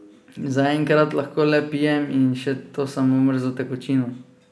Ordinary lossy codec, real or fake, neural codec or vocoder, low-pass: none; real; none; none